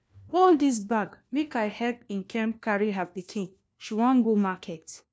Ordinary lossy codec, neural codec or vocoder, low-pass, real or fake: none; codec, 16 kHz, 1 kbps, FunCodec, trained on LibriTTS, 50 frames a second; none; fake